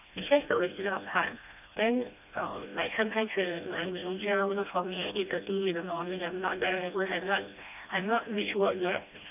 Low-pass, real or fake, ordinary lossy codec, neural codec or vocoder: 3.6 kHz; fake; none; codec, 16 kHz, 1 kbps, FreqCodec, smaller model